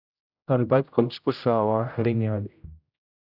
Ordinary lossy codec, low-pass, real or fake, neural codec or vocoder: none; 5.4 kHz; fake; codec, 16 kHz, 0.5 kbps, X-Codec, HuBERT features, trained on general audio